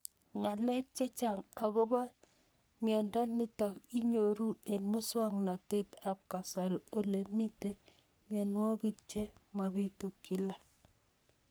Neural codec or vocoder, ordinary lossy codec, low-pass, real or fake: codec, 44.1 kHz, 3.4 kbps, Pupu-Codec; none; none; fake